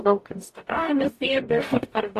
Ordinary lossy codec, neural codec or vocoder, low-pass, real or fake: AAC, 64 kbps; codec, 44.1 kHz, 0.9 kbps, DAC; 14.4 kHz; fake